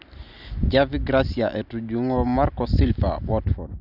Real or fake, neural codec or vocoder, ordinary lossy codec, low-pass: real; none; none; 5.4 kHz